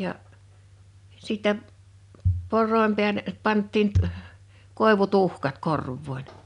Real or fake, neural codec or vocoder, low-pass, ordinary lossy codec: real; none; 10.8 kHz; none